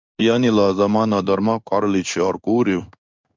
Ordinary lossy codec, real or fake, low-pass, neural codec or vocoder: MP3, 64 kbps; fake; 7.2 kHz; codec, 16 kHz in and 24 kHz out, 1 kbps, XY-Tokenizer